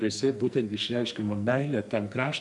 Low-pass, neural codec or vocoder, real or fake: 10.8 kHz; codec, 44.1 kHz, 2.6 kbps, SNAC; fake